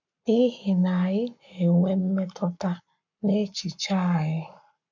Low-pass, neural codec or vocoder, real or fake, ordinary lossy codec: 7.2 kHz; codec, 44.1 kHz, 7.8 kbps, Pupu-Codec; fake; none